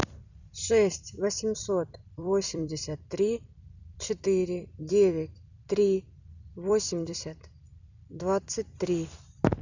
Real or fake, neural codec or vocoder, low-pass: fake; vocoder, 44.1 kHz, 128 mel bands every 256 samples, BigVGAN v2; 7.2 kHz